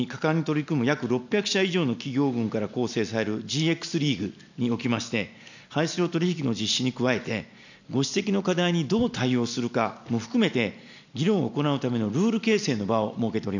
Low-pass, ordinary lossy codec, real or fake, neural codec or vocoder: 7.2 kHz; none; real; none